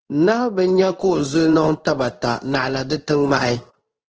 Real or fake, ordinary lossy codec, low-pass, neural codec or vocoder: fake; Opus, 16 kbps; 7.2 kHz; codec, 16 kHz in and 24 kHz out, 1 kbps, XY-Tokenizer